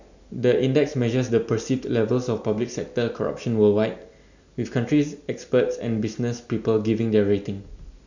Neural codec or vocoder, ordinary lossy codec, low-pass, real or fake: none; none; 7.2 kHz; real